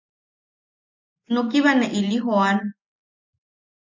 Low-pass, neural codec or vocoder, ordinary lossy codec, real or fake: 7.2 kHz; none; MP3, 48 kbps; real